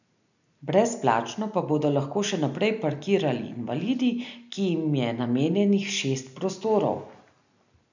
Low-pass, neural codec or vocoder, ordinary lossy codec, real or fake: 7.2 kHz; none; none; real